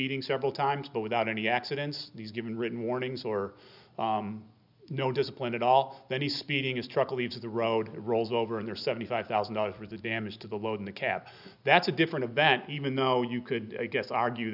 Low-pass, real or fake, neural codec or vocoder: 5.4 kHz; real; none